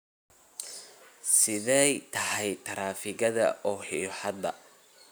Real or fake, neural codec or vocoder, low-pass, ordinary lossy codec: real; none; none; none